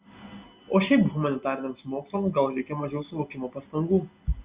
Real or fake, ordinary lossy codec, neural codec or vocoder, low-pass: real; Opus, 64 kbps; none; 3.6 kHz